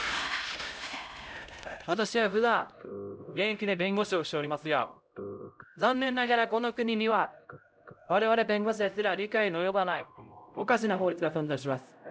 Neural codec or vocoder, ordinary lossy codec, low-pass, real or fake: codec, 16 kHz, 0.5 kbps, X-Codec, HuBERT features, trained on LibriSpeech; none; none; fake